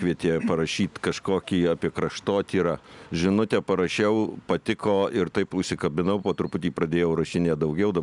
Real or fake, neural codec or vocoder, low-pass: real; none; 10.8 kHz